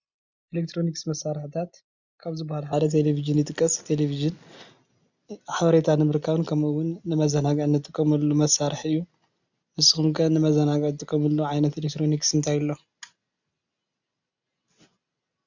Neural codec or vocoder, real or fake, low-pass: none; real; 7.2 kHz